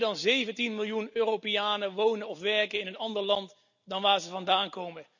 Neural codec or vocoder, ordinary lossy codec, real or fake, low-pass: none; none; real; 7.2 kHz